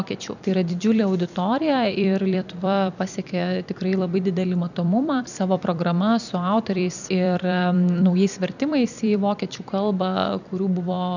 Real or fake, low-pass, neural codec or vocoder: fake; 7.2 kHz; vocoder, 44.1 kHz, 128 mel bands every 256 samples, BigVGAN v2